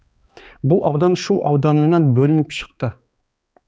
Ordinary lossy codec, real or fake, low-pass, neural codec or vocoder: none; fake; none; codec, 16 kHz, 2 kbps, X-Codec, HuBERT features, trained on balanced general audio